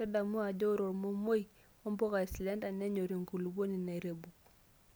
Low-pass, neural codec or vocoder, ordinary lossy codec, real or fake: none; none; none; real